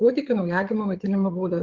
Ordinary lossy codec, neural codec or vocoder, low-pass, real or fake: Opus, 16 kbps; codec, 16 kHz, 8 kbps, FreqCodec, larger model; 7.2 kHz; fake